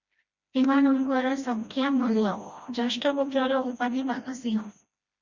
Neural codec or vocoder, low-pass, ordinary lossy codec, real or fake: codec, 16 kHz, 1 kbps, FreqCodec, smaller model; 7.2 kHz; Opus, 64 kbps; fake